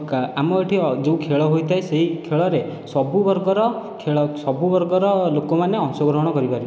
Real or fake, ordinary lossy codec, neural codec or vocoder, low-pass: real; none; none; none